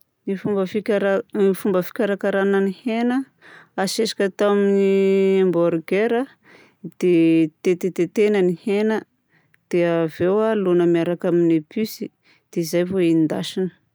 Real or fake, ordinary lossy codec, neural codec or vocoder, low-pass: real; none; none; none